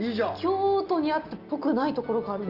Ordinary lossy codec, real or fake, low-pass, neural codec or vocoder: Opus, 32 kbps; real; 5.4 kHz; none